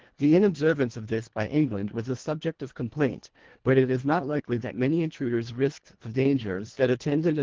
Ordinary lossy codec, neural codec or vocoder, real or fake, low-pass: Opus, 16 kbps; codec, 24 kHz, 1.5 kbps, HILCodec; fake; 7.2 kHz